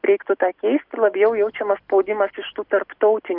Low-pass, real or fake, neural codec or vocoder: 5.4 kHz; real; none